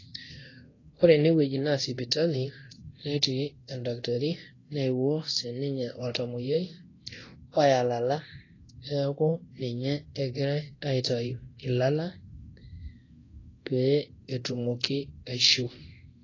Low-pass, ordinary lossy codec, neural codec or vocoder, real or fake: 7.2 kHz; AAC, 32 kbps; codec, 24 kHz, 0.9 kbps, DualCodec; fake